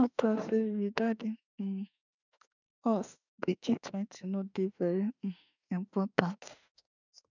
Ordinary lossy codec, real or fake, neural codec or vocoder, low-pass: none; fake; autoencoder, 48 kHz, 32 numbers a frame, DAC-VAE, trained on Japanese speech; 7.2 kHz